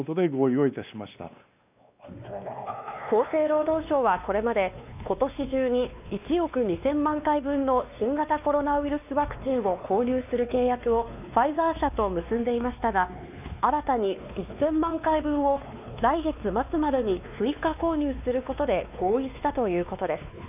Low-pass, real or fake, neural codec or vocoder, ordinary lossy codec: 3.6 kHz; fake; codec, 16 kHz, 2 kbps, X-Codec, WavLM features, trained on Multilingual LibriSpeech; none